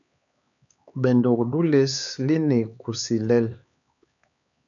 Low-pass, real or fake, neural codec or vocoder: 7.2 kHz; fake; codec, 16 kHz, 4 kbps, X-Codec, HuBERT features, trained on LibriSpeech